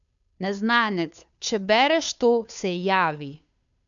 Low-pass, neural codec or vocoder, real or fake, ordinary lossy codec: 7.2 kHz; codec, 16 kHz, 2 kbps, FunCodec, trained on Chinese and English, 25 frames a second; fake; none